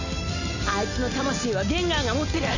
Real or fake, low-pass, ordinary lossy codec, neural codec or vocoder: real; 7.2 kHz; none; none